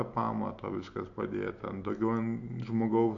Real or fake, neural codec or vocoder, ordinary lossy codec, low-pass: real; none; AAC, 48 kbps; 7.2 kHz